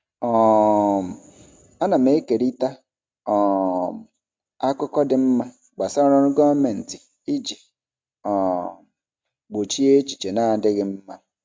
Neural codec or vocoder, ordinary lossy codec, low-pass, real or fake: none; none; none; real